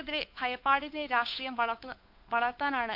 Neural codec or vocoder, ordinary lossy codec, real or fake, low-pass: codec, 16 kHz, 8 kbps, FunCodec, trained on Chinese and English, 25 frames a second; AAC, 48 kbps; fake; 5.4 kHz